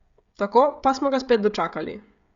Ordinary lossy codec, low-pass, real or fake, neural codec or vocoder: Opus, 64 kbps; 7.2 kHz; fake; codec, 16 kHz, 16 kbps, FreqCodec, smaller model